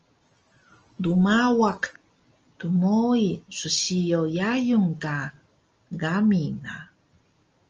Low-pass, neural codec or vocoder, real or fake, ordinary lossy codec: 7.2 kHz; none; real; Opus, 24 kbps